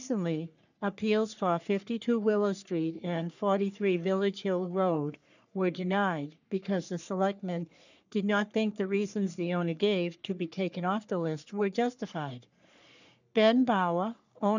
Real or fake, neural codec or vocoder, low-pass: fake; codec, 44.1 kHz, 3.4 kbps, Pupu-Codec; 7.2 kHz